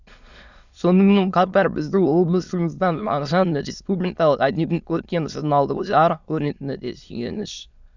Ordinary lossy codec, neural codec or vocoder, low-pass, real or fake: none; autoencoder, 22.05 kHz, a latent of 192 numbers a frame, VITS, trained on many speakers; 7.2 kHz; fake